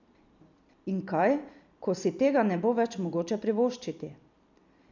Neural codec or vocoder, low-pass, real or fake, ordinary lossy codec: vocoder, 44.1 kHz, 128 mel bands every 256 samples, BigVGAN v2; 7.2 kHz; fake; none